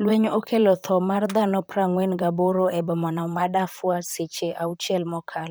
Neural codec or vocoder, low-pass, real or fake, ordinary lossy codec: vocoder, 44.1 kHz, 128 mel bands, Pupu-Vocoder; none; fake; none